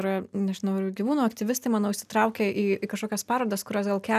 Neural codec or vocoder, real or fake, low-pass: none; real; 14.4 kHz